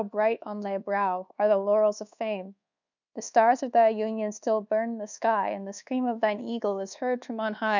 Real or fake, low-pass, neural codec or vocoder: fake; 7.2 kHz; codec, 24 kHz, 1.2 kbps, DualCodec